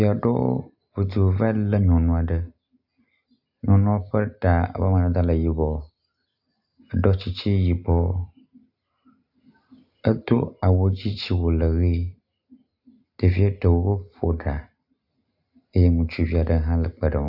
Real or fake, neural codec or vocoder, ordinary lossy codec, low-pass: real; none; AAC, 48 kbps; 5.4 kHz